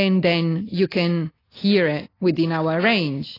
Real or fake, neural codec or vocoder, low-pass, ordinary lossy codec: real; none; 5.4 kHz; AAC, 24 kbps